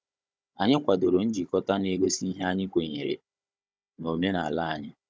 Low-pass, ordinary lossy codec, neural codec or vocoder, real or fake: none; none; codec, 16 kHz, 16 kbps, FunCodec, trained on Chinese and English, 50 frames a second; fake